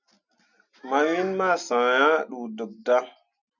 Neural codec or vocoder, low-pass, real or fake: none; 7.2 kHz; real